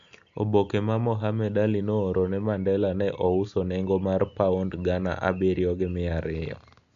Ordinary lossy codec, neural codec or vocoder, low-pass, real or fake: MP3, 64 kbps; none; 7.2 kHz; real